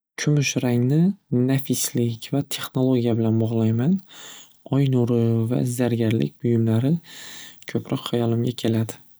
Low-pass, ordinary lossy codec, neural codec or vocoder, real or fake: none; none; none; real